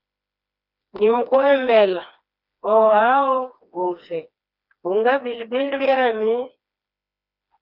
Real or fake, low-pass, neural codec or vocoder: fake; 5.4 kHz; codec, 16 kHz, 2 kbps, FreqCodec, smaller model